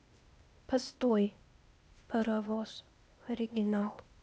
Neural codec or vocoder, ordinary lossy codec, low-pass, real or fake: codec, 16 kHz, 0.8 kbps, ZipCodec; none; none; fake